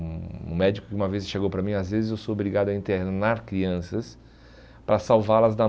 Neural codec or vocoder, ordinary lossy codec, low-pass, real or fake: none; none; none; real